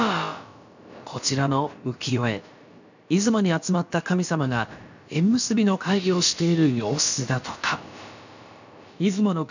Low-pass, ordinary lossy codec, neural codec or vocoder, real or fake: 7.2 kHz; none; codec, 16 kHz, about 1 kbps, DyCAST, with the encoder's durations; fake